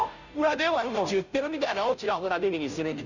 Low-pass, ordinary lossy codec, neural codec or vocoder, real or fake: 7.2 kHz; none; codec, 16 kHz, 0.5 kbps, FunCodec, trained on Chinese and English, 25 frames a second; fake